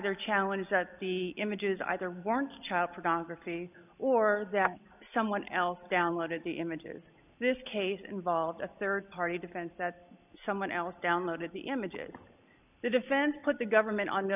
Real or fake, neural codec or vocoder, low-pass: real; none; 3.6 kHz